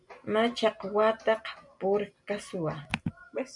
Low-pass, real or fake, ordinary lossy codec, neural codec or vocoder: 10.8 kHz; real; MP3, 96 kbps; none